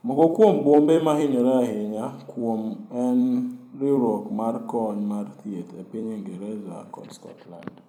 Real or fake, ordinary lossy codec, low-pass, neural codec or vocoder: fake; none; 19.8 kHz; vocoder, 44.1 kHz, 128 mel bands every 256 samples, BigVGAN v2